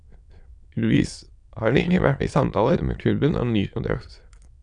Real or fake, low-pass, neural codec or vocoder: fake; 9.9 kHz; autoencoder, 22.05 kHz, a latent of 192 numbers a frame, VITS, trained on many speakers